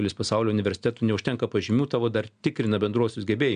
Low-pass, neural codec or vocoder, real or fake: 9.9 kHz; none; real